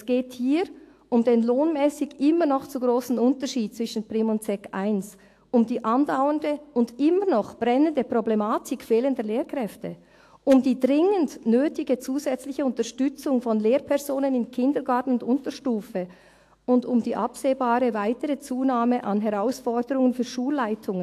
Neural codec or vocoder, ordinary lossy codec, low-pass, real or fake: autoencoder, 48 kHz, 128 numbers a frame, DAC-VAE, trained on Japanese speech; AAC, 64 kbps; 14.4 kHz; fake